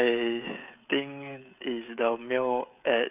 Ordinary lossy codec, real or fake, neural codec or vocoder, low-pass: none; fake; codec, 16 kHz, 16 kbps, FreqCodec, smaller model; 3.6 kHz